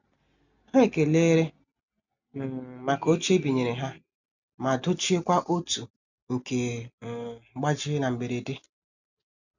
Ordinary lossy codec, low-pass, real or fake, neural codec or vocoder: none; 7.2 kHz; real; none